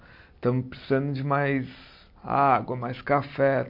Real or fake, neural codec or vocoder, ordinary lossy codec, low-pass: real; none; MP3, 48 kbps; 5.4 kHz